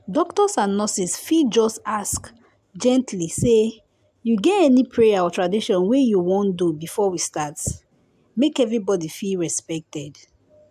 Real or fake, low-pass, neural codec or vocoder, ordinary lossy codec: real; 14.4 kHz; none; AAC, 96 kbps